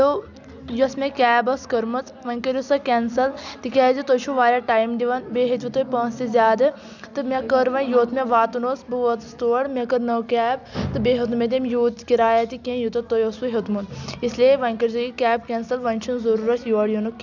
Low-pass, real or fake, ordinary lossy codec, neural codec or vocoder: 7.2 kHz; real; none; none